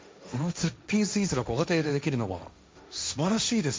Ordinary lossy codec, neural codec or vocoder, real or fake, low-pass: none; codec, 16 kHz, 1.1 kbps, Voila-Tokenizer; fake; none